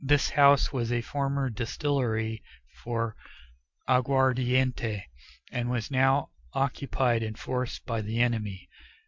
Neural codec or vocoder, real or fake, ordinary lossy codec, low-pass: none; real; MP3, 64 kbps; 7.2 kHz